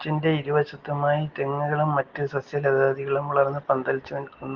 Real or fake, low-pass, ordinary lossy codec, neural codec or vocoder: real; 7.2 kHz; Opus, 16 kbps; none